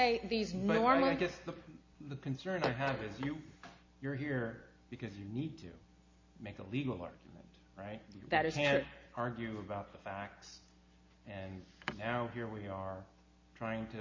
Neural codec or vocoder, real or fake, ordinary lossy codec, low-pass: none; real; MP3, 48 kbps; 7.2 kHz